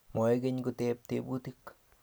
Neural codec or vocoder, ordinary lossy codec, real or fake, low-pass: none; none; real; none